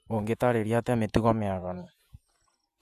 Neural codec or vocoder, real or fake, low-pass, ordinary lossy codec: vocoder, 48 kHz, 128 mel bands, Vocos; fake; 14.4 kHz; none